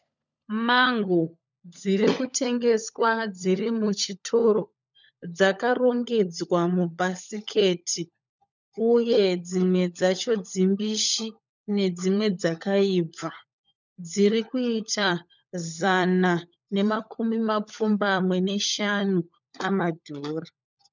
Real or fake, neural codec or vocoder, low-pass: fake; codec, 16 kHz, 16 kbps, FunCodec, trained on LibriTTS, 50 frames a second; 7.2 kHz